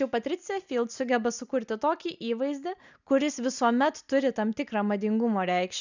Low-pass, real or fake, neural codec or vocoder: 7.2 kHz; real; none